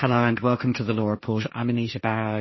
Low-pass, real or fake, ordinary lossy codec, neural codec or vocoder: 7.2 kHz; fake; MP3, 24 kbps; codec, 16 kHz, 1.1 kbps, Voila-Tokenizer